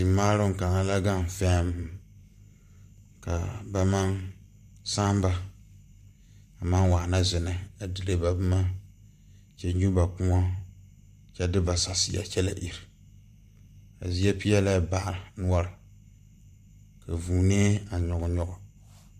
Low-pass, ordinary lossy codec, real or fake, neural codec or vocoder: 14.4 kHz; MP3, 96 kbps; fake; vocoder, 44.1 kHz, 128 mel bands every 512 samples, BigVGAN v2